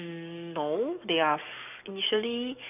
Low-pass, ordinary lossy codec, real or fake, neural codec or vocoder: 3.6 kHz; none; fake; codec, 44.1 kHz, 7.8 kbps, DAC